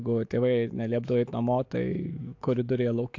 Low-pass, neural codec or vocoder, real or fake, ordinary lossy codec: 7.2 kHz; autoencoder, 48 kHz, 128 numbers a frame, DAC-VAE, trained on Japanese speech; fake; AAC, 48 kbps